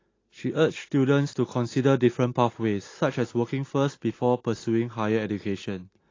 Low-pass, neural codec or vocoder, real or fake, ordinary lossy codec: 7.2 kHz; none; real; AAC, 32 kbps